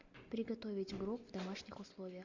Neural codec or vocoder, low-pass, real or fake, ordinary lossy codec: none; 7.2 kHz; real; AAC, 48 kbps